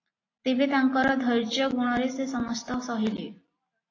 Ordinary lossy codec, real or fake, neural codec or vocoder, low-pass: AAC, 32 kbps; real; none; 7.2 kHz